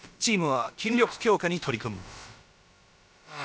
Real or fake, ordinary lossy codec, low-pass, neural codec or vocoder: fake; none; none; codec, 16 kHz, about 1 kbps, DyCAST, with the encoder's durations